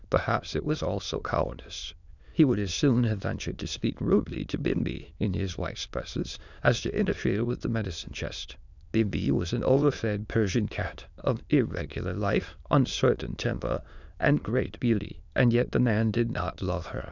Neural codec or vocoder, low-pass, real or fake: autoencoder, 22.05 kHz, a latent of 192 numbers a frame, VITS, trained on many speakers; 7.2 kHz; fake